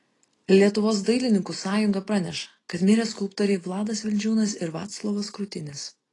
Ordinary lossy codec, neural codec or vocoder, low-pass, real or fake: AAC, 32 kbps; none; 10.8 kHz; real